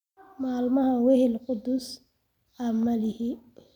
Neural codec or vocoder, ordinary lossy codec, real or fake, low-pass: none; none; real; 19.8 kHz